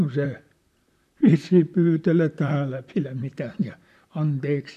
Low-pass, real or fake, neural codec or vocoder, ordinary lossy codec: 14.4 kHz; fake; vocoder, 44.1 kHz, 128 mel bands, Pupu-Vocoder; none